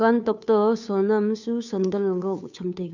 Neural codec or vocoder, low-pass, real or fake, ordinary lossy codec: codec, 16 kHz, 2 kbps, FunCodec, trained on Chinese and English, 25 frames a second; 7.2 kHz; fake; none